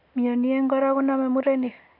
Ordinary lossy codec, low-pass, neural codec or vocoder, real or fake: AAC, 32 kbps; 5.4 kHz; none; real